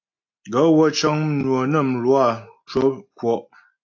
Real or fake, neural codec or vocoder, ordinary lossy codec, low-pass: real; none; AAC, 48 kbps; 7.2 kHz